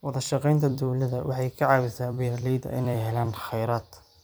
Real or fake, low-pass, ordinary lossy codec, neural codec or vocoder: fake; none; none; vocoder, 44.1 kHz, 128 mel bands every 256 samples, BigVGAN v2